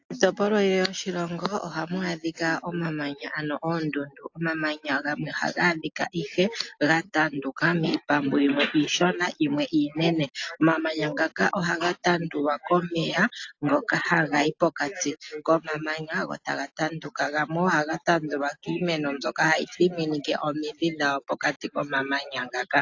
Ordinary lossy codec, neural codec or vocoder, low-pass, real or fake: AAC, 48 kbps; none; 7.2 kHz; real